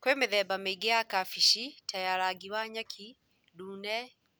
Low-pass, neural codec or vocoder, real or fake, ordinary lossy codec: none; none; real; none